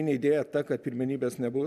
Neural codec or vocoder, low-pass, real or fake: none; 14.4 kHz; real